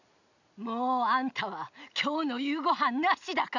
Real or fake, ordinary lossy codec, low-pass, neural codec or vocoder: real; none; 7.2 kHz; none